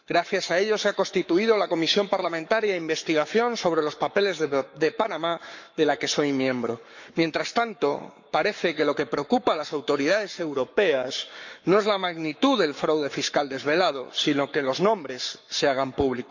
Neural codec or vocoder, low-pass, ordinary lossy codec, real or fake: codec, 44.1 kHz, 7.8 kbps, Pupu-Codec; 7.2 kHz; none; fake